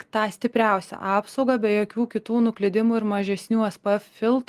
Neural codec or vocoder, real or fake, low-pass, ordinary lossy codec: none; real; 14.4 kHz; Opus, 24 kbps